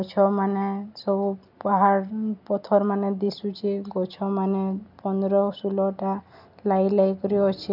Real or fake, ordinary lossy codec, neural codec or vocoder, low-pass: real; none; none; 5.4 kHz